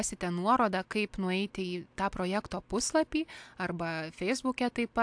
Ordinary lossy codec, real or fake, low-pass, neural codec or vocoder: AAC, 64 kbps; real; 9.9 kHz; none